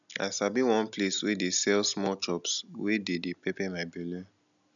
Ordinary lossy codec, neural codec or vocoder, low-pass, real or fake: none; none; 7.2 kHz; real